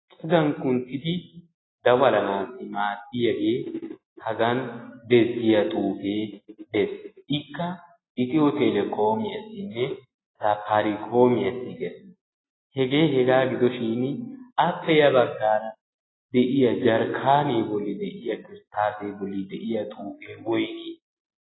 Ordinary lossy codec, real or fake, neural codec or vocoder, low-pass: AAC, 16 kbps; real; none; 7.2 kHz